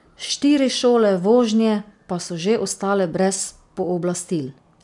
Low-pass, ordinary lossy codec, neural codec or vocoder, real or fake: 10.8 kHz; none; none; real